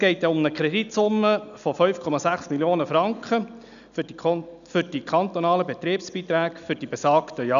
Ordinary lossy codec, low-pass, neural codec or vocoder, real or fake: none; 7.2 kHz; none; real